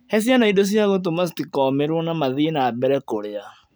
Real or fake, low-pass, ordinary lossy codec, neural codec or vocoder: real; none; none; none